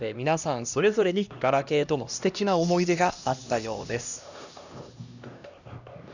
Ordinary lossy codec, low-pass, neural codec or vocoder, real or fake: none; 7.2 kHz; codec, 16 kHz, 1 kbps, X-Codec, HuBERT features, trained on LibriSpeech; fake